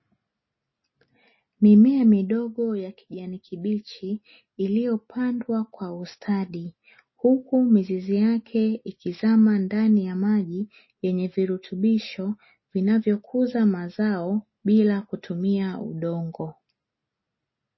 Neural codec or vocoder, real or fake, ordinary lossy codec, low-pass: none; real; MP3, 24 kbps; 7.2 kHz